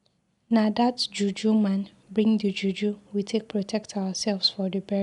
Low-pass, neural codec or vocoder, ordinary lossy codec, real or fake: 10.8 kHz; none; none; real